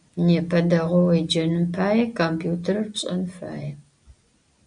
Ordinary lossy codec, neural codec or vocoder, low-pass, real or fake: MP3, 96 kbps; none; 9.9 kHz; real